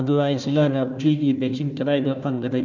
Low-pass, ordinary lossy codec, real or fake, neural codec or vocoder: 7.2 kHz; none; fake; codec, 16 kHz, 1 kbps, FunCodec, trained on Chinese and English, 50 frames a second